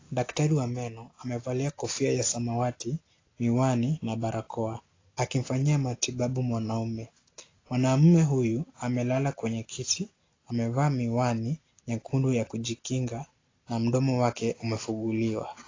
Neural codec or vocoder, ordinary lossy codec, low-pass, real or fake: none; AAC, 32 kbps; 7.2 kHz; real